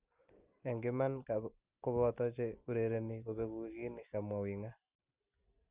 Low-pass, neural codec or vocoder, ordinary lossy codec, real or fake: 3.6 kHz; none; Opus, 32 kbps; real